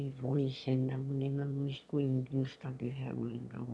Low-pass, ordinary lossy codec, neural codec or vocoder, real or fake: none; none; autoencoder, 22.05 kHz, a latent of 192 numbers a frame, VITS, trained on one speaker; fake